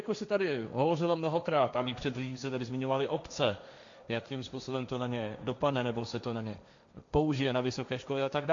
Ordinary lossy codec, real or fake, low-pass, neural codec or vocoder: AAC, 64 kbps; fake; 7.2 kHz; codec, 16 kHz, 1.1 kbps, Voila-Tokenizer